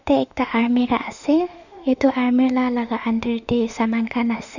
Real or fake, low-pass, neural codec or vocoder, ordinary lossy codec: fake; 7.2 kHz; autoencoder, 48 kHz, 32 numbers a frame, DAC-VAE, trained on Japanese speech; none